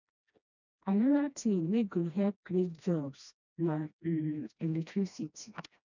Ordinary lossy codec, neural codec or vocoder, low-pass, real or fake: none; codec, 16 kHz, 1 kbps, FreqCodec, smaller model; 7.2 kHz; fake